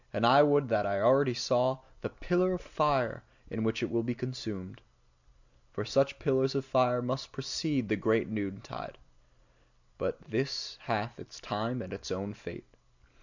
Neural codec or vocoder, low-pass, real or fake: none; 7.2 kHz; real